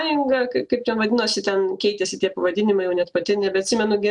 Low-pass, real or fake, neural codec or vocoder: 10.8 kHz; real; none